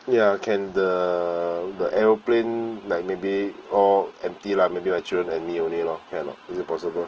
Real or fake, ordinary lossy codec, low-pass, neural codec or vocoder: real; Opus, 16 kbps; 7.2 kHz; none